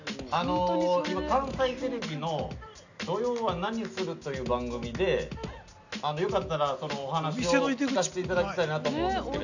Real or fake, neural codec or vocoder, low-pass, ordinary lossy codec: real; none; 7.2 kHz; none